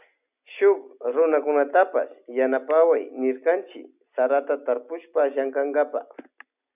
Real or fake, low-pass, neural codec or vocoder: real; 3.6 kHz; none